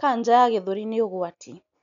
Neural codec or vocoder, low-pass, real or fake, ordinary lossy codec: none; 7.2 kHz; real; none